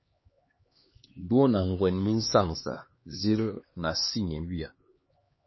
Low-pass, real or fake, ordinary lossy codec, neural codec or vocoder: 7.2 kHz; fake; MP3, 24 kbps; codec, 16 kHz, 2 kbps, X-Codec, HuBERT features, trained on LibriSpeech